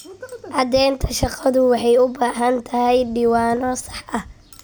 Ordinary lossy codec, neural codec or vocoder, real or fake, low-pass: none; none; real; none